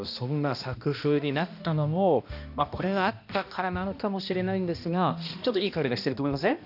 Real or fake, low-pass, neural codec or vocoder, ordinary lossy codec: fake; 5.4 kHz; codec, 16 kHz, 1 kbps, X-Codec, HuBERT features, trained on balanced general audio; none